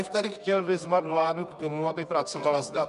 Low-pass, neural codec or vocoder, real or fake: 10.8 kHz; codec, 24 kHz, 0.9 kbps, WavTokenizer, medium music audio release; fake